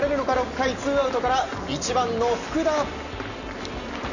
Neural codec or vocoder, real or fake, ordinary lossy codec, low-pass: none; real; none; 7.2 kHz